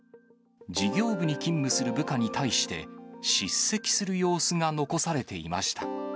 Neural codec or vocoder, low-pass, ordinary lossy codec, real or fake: none; none; none; real